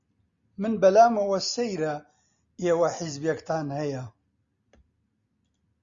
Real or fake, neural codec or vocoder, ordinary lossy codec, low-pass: real; none; Opus, 64 kbps; 7.2 kHz